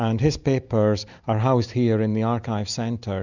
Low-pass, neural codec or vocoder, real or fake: 7.2 kHz; none; real